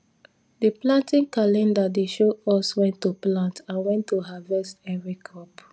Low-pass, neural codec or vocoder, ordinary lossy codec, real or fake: none; none; none; real